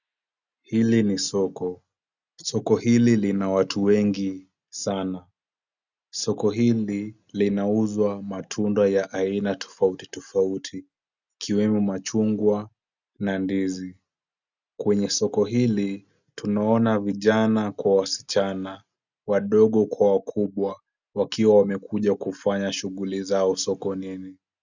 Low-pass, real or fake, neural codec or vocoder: 7.2 kHz; real; none